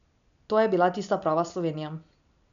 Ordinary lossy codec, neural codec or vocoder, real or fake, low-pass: none; none; real; 7.2 kHz